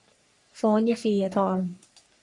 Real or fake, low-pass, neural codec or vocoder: fake; 10.8 kHz; codec, 44.1 kHz, 3.4 kbps, Pupu-Codec